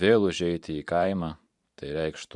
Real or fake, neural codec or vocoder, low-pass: fake; vocoder, 44.1 kHz, 128 mel bands every 512 samples, BigVGAN v2; 10.8 kHz